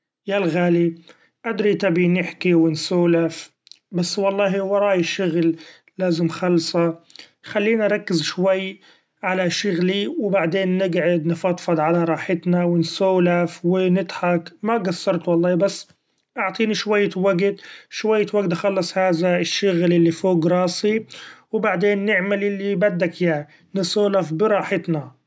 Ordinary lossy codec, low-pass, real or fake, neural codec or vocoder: none; none; real; none